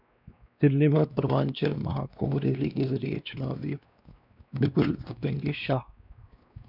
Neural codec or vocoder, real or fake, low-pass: codec, 16 kHz, 2 kbps, X-Codec, WavLM features, trained on Multilingual LibriSpeech; fake; 5.4 kHz